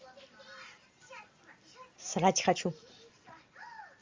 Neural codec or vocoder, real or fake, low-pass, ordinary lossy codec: none; real; 7.2 kHz; Opus, 32 kbps